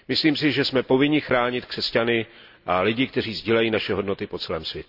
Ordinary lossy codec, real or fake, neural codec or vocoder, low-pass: none; real; none; 5.4 kHz